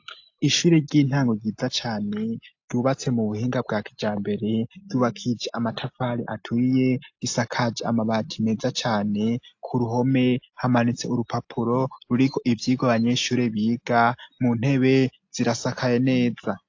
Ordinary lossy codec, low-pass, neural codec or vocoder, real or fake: AAC, 48 kbps; 7.2 kHz; none; real